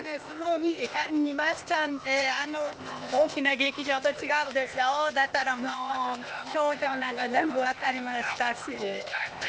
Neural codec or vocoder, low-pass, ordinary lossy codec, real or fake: codec, 16 kHz, 0.8 kbps, ZipCodec; none; none; fake